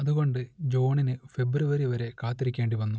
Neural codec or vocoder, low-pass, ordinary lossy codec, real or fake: none; none; none; real